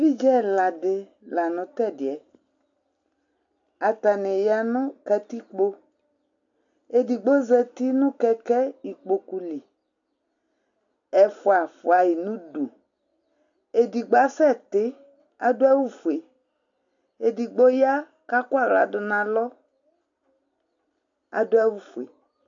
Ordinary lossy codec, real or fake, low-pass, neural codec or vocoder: AAC, 64 kbps; real; 7.2 kHz; none